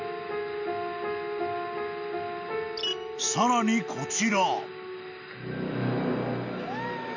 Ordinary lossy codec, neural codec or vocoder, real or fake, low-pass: none; none; real; 7.2 kHz